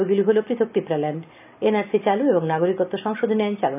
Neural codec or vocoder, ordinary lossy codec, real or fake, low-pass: none; none; real; 3.6 kHz